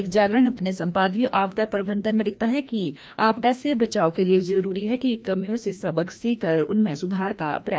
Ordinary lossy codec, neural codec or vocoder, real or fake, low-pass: none; codec, 16 kHz, 1 kbps, FreqCodec, larger model; fake; none